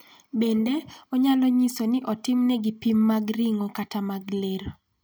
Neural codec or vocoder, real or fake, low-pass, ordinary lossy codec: none; real; none; none